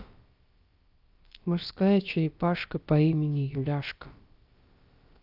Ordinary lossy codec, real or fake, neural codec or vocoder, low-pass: Opus, 24 kbps; fake; codec, 16 kHz, about 1 kbps, DyCAST, with the encoder's durations; 5.4 kHz